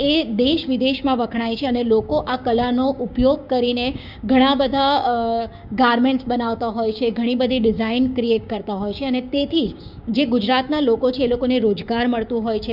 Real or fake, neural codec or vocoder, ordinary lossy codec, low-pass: fake; codec, 16 kHz, 6 kbps, DAC; none; 5.4 kHz